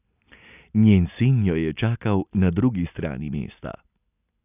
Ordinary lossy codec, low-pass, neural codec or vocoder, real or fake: none; 3.6 kHz; none; real